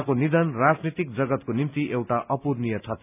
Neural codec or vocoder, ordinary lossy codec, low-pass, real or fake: none; none; 3.6 kHz; real